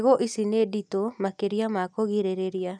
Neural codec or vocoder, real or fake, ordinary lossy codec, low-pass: none; real; none; 9.9 kHz